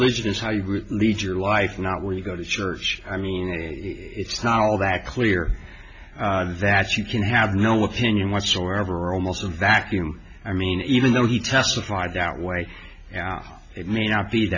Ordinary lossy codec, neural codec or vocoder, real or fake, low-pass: MP3, 64 kbps; none; real; 7.2 kHz